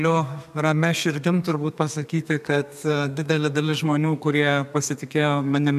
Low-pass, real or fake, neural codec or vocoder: 14.4 kHz; fake; codec, 32 kHz, 1.9 kbps, SNAC